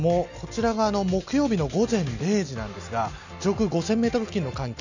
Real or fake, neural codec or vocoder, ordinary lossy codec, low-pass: real; none; none; 7.2 kHz